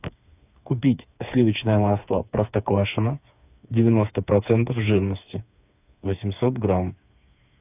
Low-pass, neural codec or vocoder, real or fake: 3.6 kHz; codec, 16 kHz, 4 kbps, FreqCodec, smaller model; fake